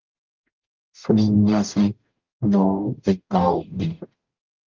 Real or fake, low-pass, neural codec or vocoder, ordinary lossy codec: fake; 7.2 kHz; codec, 44.1 kHz, 0.9 kbps, DAC; Opus, 24 kbps